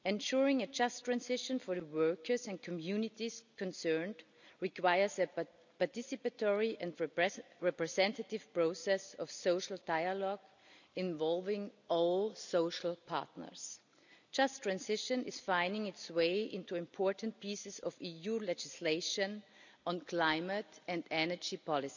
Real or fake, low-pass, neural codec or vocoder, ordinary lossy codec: real; 7.2 kHz; none; none